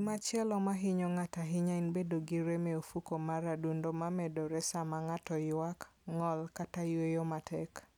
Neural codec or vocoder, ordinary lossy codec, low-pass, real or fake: none; none; 19.8 kHz; real